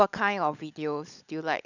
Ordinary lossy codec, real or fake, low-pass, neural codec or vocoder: none; fake; 7.2 kHz; codec, 16 kHz, 16 kbps, FunCodec, trained on LibriTTS, 50 frames a second